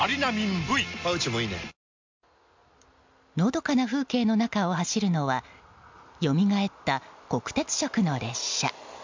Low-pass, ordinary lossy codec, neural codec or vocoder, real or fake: 7.2 kHz; MP3, 64 kbps; none; real